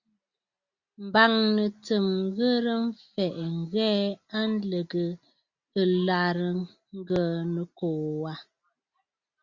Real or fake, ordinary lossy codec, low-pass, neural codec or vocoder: real; Opus, 64 kbps; 7.2 kHz; none